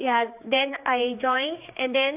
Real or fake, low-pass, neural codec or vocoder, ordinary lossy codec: fake; 3.6 kHz; codec, 16 kHz, 4 kbps, X-Codec, HuBERT features, trained on general audio; AAC, 32 kbps